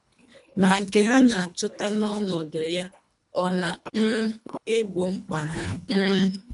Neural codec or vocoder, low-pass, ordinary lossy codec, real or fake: codec, 24 kHz, 1.5 kbps, HILCodec; 10.8 kHz; none; fake